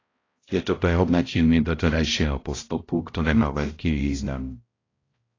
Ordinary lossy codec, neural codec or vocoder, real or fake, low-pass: AAC, 32 kbps; codec, 16 kHz, 0.5 kbps, X-Codec, HuBERT features, trained on balanced general audio; fake; 7.2 kHz